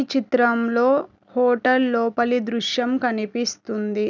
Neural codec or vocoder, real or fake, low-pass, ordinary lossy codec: none; real; 7.2 kHz; none